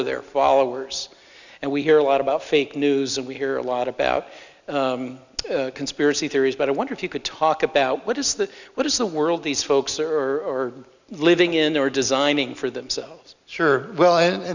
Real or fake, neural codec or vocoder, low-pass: real; none; 7.2 kHz